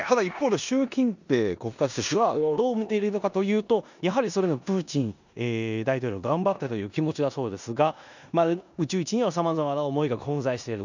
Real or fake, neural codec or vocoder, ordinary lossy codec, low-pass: fake; codec, 16 kHz in and 24 kHz out, 0.9 kbps, LongCat-Audio-Codec, four codebook decoder; none; 7.2 kHz